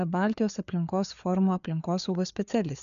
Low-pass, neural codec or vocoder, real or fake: 7.2 kHz; codec, 16 kHz, 4 kbps, FreqCodec, larger model; fake